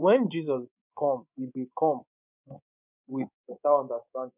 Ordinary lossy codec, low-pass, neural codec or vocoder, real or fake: none; 3.6 kHz; codec, 24 kHz, 3.1 kbps, DualCodec; fake